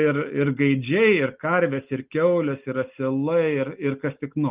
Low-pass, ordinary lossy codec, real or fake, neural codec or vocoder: 3.6 kHz; Opus, 32 kbps; real; none